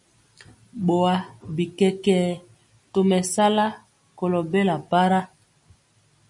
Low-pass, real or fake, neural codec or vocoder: 10.8 kHz; real; none